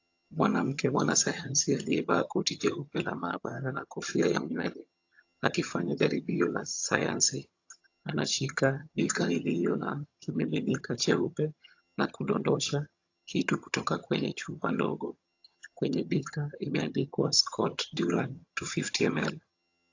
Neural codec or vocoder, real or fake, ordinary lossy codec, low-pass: vocoder, 22.05 kHz, 80 mel bands, HiFi-GAN; fake; AAC, 48 kbps; 7.2 kHz